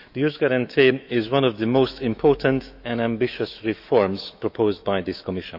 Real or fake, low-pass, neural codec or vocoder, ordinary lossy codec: fake; 5.4 kHz; autoencoder, 48 kHz, 128 numbers a frame, DAC-VAE, trained on Japanese speech; none